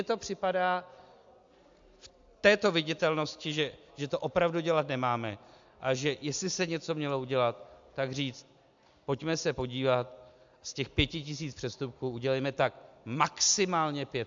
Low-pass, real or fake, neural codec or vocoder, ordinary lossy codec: 7.2 kHz; real; none; AAC, 64 kbps